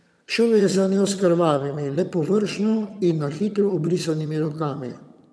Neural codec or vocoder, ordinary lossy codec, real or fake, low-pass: vocoder, 22.05 kHz, 80 mel bands, HiFi-GAN; none; fake; none